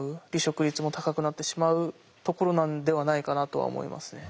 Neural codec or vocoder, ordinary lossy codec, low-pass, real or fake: none; none; none; real